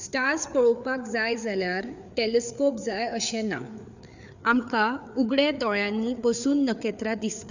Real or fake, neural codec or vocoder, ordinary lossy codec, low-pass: fake; codec, 16 kHz, 4 kbps, FreqCodec, larger model; none; 7.2 kHz